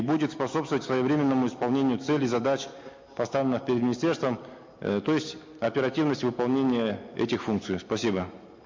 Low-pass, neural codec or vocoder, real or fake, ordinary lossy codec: 7.2 kHz; none; real; MP3, 48 kbps